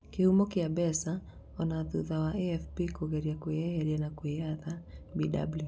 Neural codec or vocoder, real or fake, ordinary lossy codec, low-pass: none; real; none; none